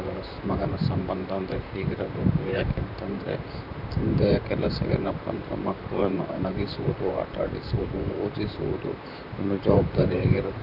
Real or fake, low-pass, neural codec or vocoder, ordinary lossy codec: fake; 5.4 kHz; vocoder, 44.1 kHz, 128 mel bands, Pupu-Vocoder; none